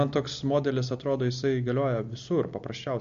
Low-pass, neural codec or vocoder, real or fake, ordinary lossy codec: 7.2 kHz; none; real; MP3, 48 kbps